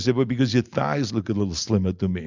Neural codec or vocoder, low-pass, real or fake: none; 7.2 kHz; real